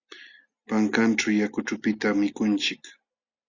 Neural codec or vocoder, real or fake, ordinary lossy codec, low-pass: none; real; Opus, 64 kbps; 7.2 kHz